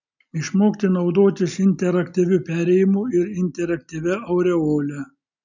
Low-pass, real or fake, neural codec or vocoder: 7.2 kHz; real; none